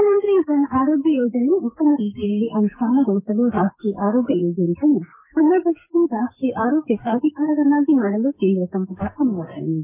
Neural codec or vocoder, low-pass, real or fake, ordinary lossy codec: codec, 44.1 kHz, 2.6 kbps, SNAC; 3.6 kHz; fake; MP3, 24 kbps